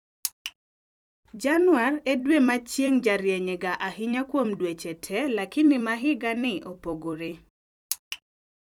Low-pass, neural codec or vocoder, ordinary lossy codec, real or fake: 19.8 kHz; vocoder, 44.1 kHz, 128 mel bands every 256 samples, BigVGAN v2; none; fake